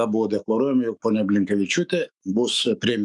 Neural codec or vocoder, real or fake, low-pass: autoencoder, 48 kHz, 128 numbers a frame, DAC-VAE, trained on Japanese speech; fake; 10.8 kHz